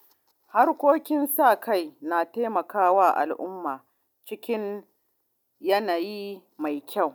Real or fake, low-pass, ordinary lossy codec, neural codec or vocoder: real; none; none; none